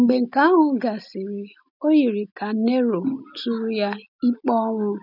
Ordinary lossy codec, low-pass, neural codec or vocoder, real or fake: none; 5.4 kHz; none; real